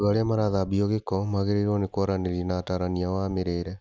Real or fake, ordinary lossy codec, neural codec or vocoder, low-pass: real; none; none; none